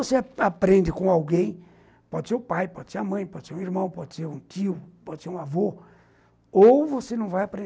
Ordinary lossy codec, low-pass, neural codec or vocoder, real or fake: none; none; none; real